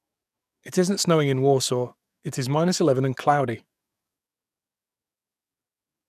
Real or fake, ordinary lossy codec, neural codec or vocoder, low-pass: fake; none; codec, 44.1 kHz, 7.8 kbps, DAC; 14.4 kHz